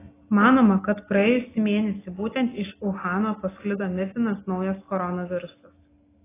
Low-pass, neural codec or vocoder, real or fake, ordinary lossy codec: 3.6 kHz; none; real; AAC, 16 kbps